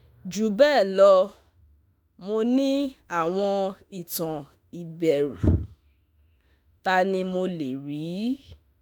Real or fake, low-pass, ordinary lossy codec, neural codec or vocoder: fake; none; none; autoencoder, 48 kHz, 32 numbers a frame, DAC-VAE, trained on Japanese speech